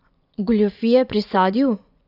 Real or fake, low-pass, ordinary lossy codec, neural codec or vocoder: fake; 5.4 kHz; none; vocoder, 24 kHz, 100 mel bands, Vocos